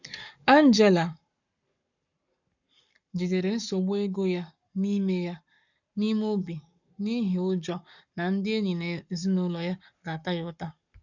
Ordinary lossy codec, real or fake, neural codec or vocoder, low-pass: none; fake; codec, 44.1 kHz, 7.8 kbps, Pupu-Codec; 7.2 kHz